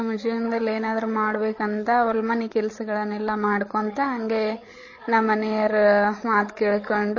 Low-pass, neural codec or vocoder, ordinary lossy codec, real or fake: 7.2 kHz; codec, 16 kHz, 8 kbps, FreqCodec, larger model; MP3, 32 kbps; fake